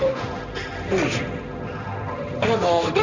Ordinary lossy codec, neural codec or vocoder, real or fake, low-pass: none; codec, 16 kHz, 1.1 kbps, Voila-Tokenizer; fake; 7.2 kHz